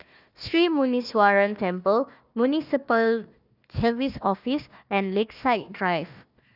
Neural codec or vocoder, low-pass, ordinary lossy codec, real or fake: codec, 16 kHz, 1 kbps, FunCodec, trained on Chinese and English, 50 frames a second; 5.4 kHz; none; fake